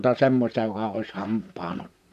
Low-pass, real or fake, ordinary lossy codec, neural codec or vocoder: 14.4 kHz; fake; none; vocoder, 44.1 kHz, 128 mel bands, Pupu-Vocoder